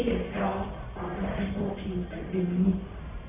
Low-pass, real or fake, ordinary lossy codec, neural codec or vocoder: 3.6 kHz; fake; none; codec, 44.1 kHz, 1.7 kbps, Pupu-Codec